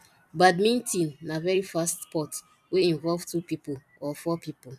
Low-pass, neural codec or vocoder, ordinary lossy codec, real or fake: 14.4 kHz; vocoder, 44.1 kHz, 128 mel bands every 256 samples, BigVGAN v2; none; fake